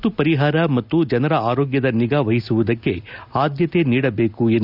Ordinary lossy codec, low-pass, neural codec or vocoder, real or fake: none; 5.4 kHz; none; real